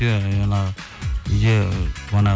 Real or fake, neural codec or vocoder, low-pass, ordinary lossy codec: real; none; none; none